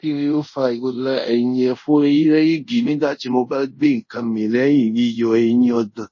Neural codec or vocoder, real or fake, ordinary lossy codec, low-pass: codec, 24 kHz, 0.5 kbps, DualCodec; fake; MP3, 32 kbps; 7.2 kHz